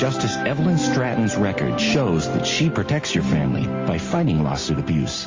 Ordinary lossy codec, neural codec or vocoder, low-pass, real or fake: Opus, 32 kbps; none; 7.2 kHz; real